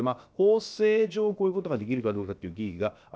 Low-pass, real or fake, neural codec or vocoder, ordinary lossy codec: none; fake; codec, 16 kHz, about 1 kbps, DyCAST, with the encoder's durations; none